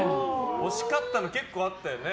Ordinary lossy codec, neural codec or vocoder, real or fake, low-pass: none; none; real; none